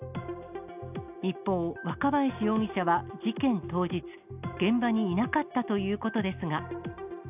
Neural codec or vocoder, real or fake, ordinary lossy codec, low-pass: none; real; none; 3.6 kHz